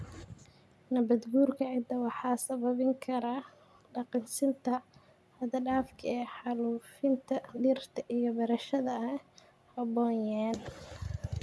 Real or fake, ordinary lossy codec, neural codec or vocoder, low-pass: real; none; none; none